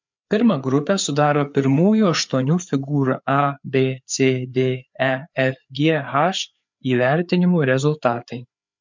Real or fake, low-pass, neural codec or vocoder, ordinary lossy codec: fake; 7.2 kHz; codec, 16 kHz, 4 kbps, FreqCodec, larger model; MP3, 64 kbps